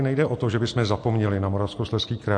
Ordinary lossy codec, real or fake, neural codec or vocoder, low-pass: MP3, 48 kbps; real; none; 9.9 kHz